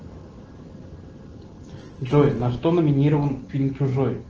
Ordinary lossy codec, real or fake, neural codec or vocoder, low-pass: Opus, 16 kbps; real; none; 7.2 kHz